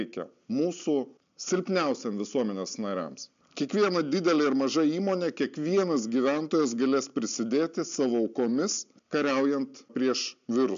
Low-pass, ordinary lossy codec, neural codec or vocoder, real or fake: 7.2 kHz; MP3, 64 kbps; none; real